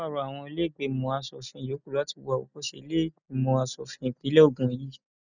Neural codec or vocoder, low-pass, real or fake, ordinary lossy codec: none; 7.2 kHz; real; none